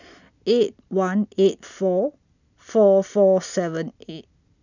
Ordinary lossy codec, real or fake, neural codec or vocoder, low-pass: none; real; none; 7.2 kHz